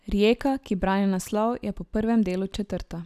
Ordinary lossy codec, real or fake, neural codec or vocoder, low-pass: none; real; none; 14.4 kHz